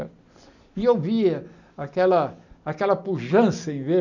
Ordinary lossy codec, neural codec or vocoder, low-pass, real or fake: none; none; 7.2 kHz; real